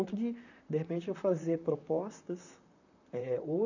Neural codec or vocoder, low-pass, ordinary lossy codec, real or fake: vocoder, 44.1 kHz, 128 mel bands, Pupu-Vocoder; 7.2 kHz; none; fake